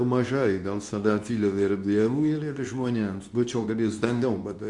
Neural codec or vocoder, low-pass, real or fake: codec, 24 kHz, 0.9 kbps, WavTokenizer, medium speech release version 2; 10.8 kHz; fake